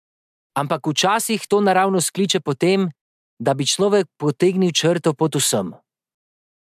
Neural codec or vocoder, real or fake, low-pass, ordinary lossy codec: none; real; 14.4 kHz; MP3, 96 kbps